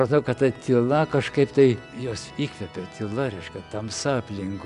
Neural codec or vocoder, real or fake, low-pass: none; real; 10.8 kHz